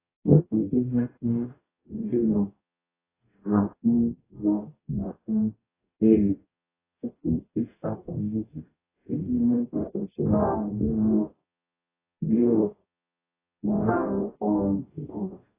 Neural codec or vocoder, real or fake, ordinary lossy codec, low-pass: codec, 44.1 kHz, 0.9 kbps, DAC; fake; AAC, 16 kbps; 3.6 kHz